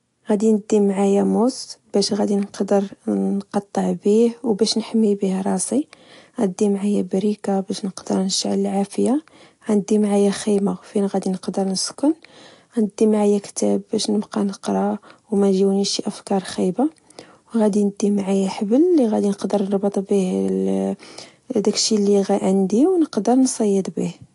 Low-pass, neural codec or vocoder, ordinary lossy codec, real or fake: 10.8 kHz; none; AAC, 48 kbps; real